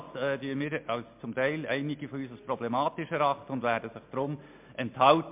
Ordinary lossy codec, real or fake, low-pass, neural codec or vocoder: MP3, 32 kbps; real; 3.6 kHz; none